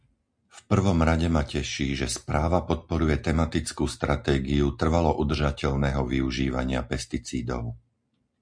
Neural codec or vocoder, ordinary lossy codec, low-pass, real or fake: none; MP3, 64 kbps; 9.9 kHz; real